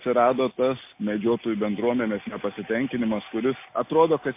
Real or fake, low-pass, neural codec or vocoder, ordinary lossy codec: real; 3.6 kHz; none; MP3, 24 kbps